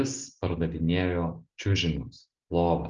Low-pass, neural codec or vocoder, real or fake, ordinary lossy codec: 7.2 kHz; none; real; Opus, 24 kbps